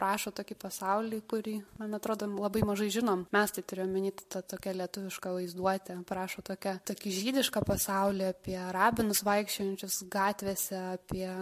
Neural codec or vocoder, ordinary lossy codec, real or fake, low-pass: none; MP3, 64 kbps; real; 14.4 kHz